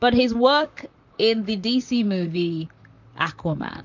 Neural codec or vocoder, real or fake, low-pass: vocoder, 44.1 kHz, 128 mel bands, Pupu-Vocoder; fake; 7.2 kHz